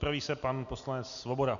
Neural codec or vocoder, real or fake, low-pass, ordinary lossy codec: none; real; 7.2 kHz; MP3, 96 kbps